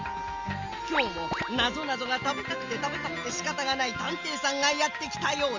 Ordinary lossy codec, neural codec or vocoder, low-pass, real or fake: Opus, 32 kbps; none; 7.2 kHz; real